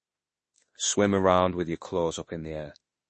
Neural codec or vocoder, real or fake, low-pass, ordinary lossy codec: autoencoder, 48 kHz, 32 numbers a frame, DAC-VAE, trained on Japanese speech; fake; 10.8 kHz; MP3, 32 kbps